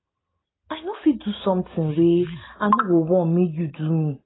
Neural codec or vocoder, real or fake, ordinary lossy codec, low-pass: none; real; AAC, 16 kbps; 7.2 kHz